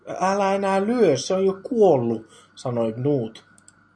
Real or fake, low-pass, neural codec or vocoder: real; 9.9 kHz; none